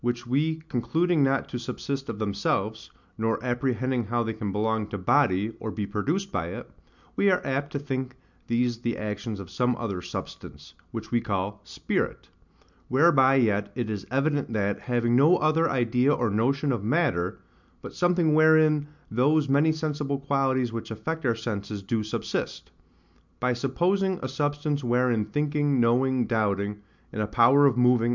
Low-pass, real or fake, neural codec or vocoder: 7.2 kHz; real; none